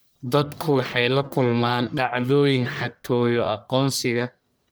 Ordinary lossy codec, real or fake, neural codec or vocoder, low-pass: none; fake; codec, 44.1 kHz, 1.7 kbps, Pupu-Codec; none